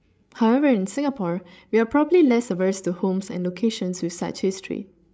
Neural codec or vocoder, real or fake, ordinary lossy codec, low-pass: codec, 16 kHz, 16 kbps, FreqCodec, larger model; fake; none; none